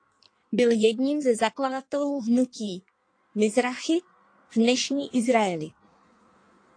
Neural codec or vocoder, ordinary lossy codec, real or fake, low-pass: codec, 16 kHz in and 24 kHz out, 1.1 kbps, FireRedTTS-2 codec; AAC, 48 kbps; fake; 9.9 kHz